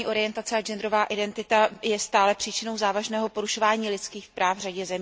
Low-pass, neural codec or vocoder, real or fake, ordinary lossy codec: none; none; real; none